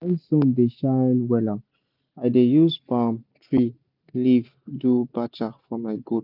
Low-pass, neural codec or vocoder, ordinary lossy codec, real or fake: 5.4 kHz; none; none; real